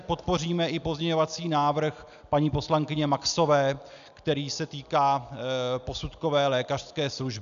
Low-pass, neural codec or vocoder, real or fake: 7.2 kHz; none; real